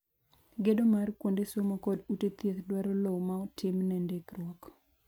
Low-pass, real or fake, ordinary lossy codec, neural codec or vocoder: none; real; none; none